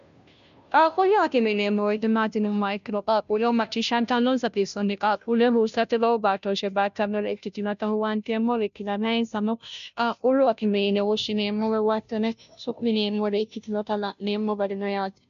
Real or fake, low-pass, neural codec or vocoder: fake; 7.2 kHz; codec, 16 kHz, 0.5 kbps, FunCodec, trained on Chinese and English, 25 frames a second